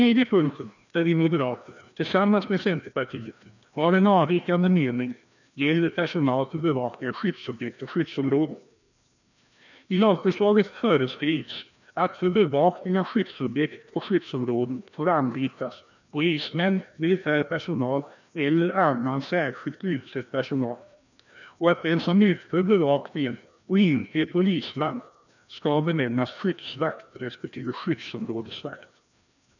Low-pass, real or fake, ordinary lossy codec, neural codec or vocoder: 7.2 kHz; fake; none; codec, 16 kHz, 1 kbps, FreqCodec, larger model